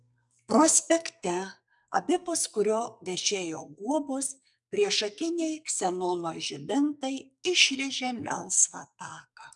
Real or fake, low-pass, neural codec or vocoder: fake; 10.8 kHz; codec, 44.1 kHz, 2.6 kbps, SNAC